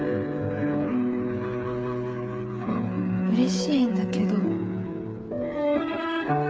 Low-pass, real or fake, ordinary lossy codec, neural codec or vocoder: none; fake; none; codec, 16 kHz, 8 kbps, FreqCodec, smaller model